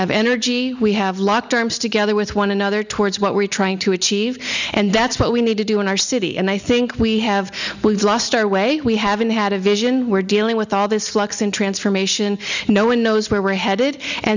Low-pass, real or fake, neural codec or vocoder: 7.2 kHz; real; none